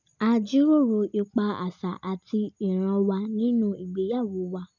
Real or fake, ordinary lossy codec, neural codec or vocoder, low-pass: real; none; none; 7.2 kHz